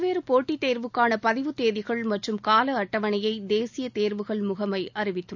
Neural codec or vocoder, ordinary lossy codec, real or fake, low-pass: none; none; real; 7.2 kHz